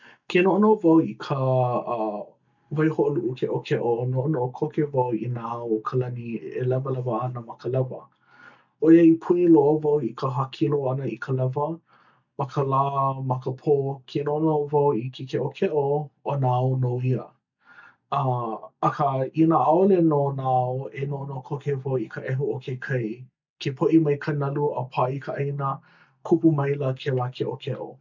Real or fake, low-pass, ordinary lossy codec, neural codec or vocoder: real; 7.2 kHz; none; none